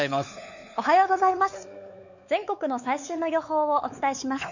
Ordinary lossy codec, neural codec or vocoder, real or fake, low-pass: none; codec, 16 kHz, 4 kbps, X-Codec, WavLM features, trained on Multilingual LibriSpeech; fake; 7.2 kHz